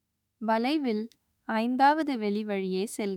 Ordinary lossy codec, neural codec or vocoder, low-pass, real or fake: none; autoencoder, 48 kHz, 32 numbers a frame, DAC-VAE, trained on Japanese speech; 19.8 kHz; fake